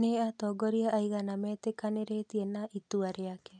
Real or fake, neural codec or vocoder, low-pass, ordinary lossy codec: real; none; 9.9 kHz; none